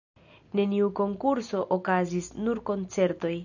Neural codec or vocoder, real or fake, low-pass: none; real; 7.2 kHz